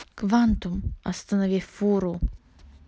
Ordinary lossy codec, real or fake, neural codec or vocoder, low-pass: none; real; none; none